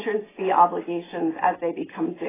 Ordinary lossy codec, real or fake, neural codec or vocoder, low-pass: AAC, 16 kbps; real; none; 3.6 kHz